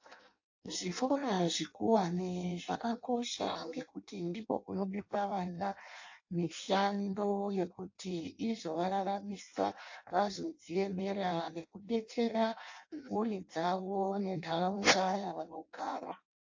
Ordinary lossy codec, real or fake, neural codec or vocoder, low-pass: AAC, 48 kbps; fake; codec, 16 kHz in and 24 kHz out, 0.6 kbps, FireRedTTS-2 codec; 7.2 kHz